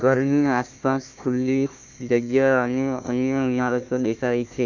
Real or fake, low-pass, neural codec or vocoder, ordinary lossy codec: fake; 7.2 kHz; codec, 16 kHz, 1 kbps, FunCodec, trained on Chinese and English, 50 frames a second; none